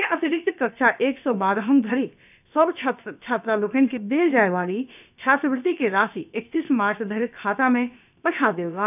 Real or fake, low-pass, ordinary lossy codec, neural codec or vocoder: fake; 3.6 kHz; none; codec, 16 kHz, about 1 kbps, DyCAST, with the encoder's durations